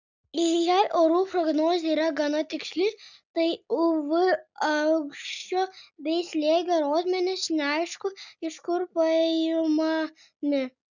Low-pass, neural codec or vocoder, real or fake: 7.2 kHz; none; real